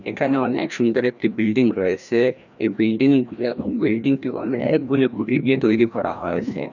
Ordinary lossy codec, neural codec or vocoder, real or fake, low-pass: none; codec, 16 kHz, 1 kbps, FreqCodec, larger model; fake; 7.2 kHz